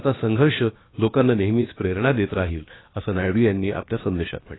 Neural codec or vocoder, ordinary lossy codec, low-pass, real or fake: codec, 16 kHz, about 1 kbps, DyCAST, with the encoder's durations; AAC, 16 kbps; 7.2 kHz; fake